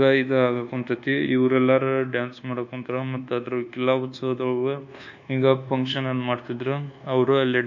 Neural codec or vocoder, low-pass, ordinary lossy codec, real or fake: codec, 24 kHz, 1.2 kbps, DualCodec; 7.2 kHz; none; fake